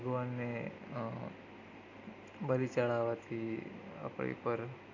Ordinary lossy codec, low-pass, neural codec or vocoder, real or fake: AAC, 48 kbps; 7.2 kHz; none; real